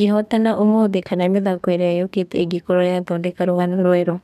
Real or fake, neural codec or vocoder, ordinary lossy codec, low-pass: fake; codec, 32 kHz, 1.9 kbps, SNAC; none; 14.4 kHz